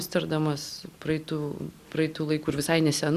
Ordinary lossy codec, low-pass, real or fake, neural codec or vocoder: Opus, 64 kbps; 14.4 kHz; real; none